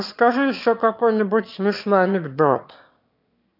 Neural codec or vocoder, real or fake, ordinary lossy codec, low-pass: autoencoder, 22.05 kHz, a latent of 192 numbers a frame, VITS, trained on one speaker; fake; none; 5.4 kHz